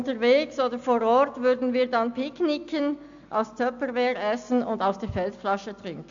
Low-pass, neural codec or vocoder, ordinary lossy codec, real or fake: 7.2 kHz; none; AAC, 64 kbps; real